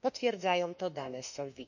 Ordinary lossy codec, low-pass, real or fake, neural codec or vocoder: none; 7.2 kHz; fake; autoencoder, 48 kHz, 32 numbers a frame, DAC-VAE, trained on Japanese speech